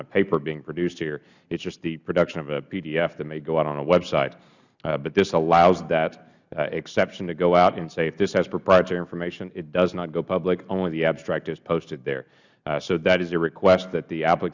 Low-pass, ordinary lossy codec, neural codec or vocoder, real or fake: 7.2 kHz; Opus, 64 kbps; none; real